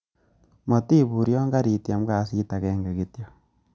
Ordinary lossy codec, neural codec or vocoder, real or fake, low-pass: none; none; real; none